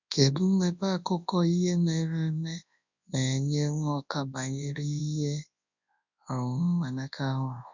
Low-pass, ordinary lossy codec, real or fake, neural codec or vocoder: 7.2 kHz; none; fake; codec, 24 kHz, 0.9 kbps, WavTokenizer, large speech release